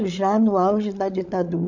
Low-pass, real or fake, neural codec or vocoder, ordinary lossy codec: 7.2 kHz; fake; codec, 16 kHz, 16 kbps, FreqCodec, larger model; MP3, 64 kbps